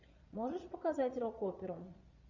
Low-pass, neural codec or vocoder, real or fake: 7.2 kHz; vocoder, 22.05 kHz, 80 mel bands, Vocos; fake